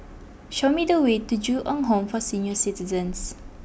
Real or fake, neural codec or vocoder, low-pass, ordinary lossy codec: real; none; none; none